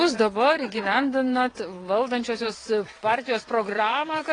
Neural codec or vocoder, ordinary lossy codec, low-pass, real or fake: vocoder, 22.05 kHz, 80 mel bands, WaveNeXt; AAC, 32 kbps; 9.9 kHz; fake